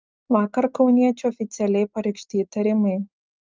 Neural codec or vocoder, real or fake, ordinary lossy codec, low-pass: none; real; Opus, 32 kbps; 7.2 kHz